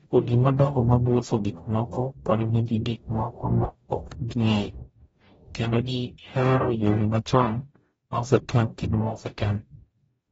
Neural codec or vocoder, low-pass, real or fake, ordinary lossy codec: codec, 44.1 kHz, 0.9 kbps, DAC; 19.8 kHz; fake; AAC, 24 kbps